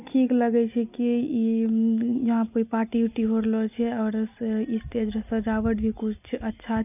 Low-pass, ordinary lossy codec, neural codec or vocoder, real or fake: 3.6 kHz; none; none; real